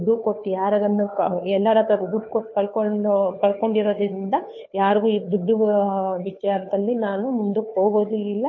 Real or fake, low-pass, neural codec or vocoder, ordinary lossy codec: fake; 7.2 kHz; codec, 16 kHz, 2 kbps, FunCodec, trained on Chinese and English, 25 frames a second; MP3, 32 kbps